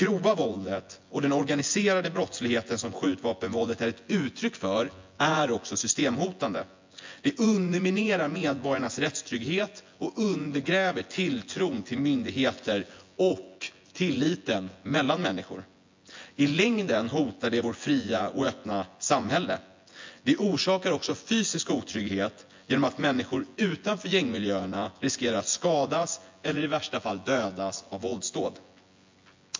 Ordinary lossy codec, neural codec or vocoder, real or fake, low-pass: MP3, 48 kbps; vocoder, 24 kHz, 100 mel bands, Vocos; fake; 7.2 kHz